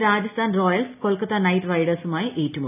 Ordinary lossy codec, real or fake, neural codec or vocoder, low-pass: none; real; none; 3.6 kHz